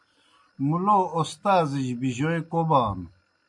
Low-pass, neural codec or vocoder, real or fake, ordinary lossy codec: 10.8 kHz; none; real; MP3, 64 kbps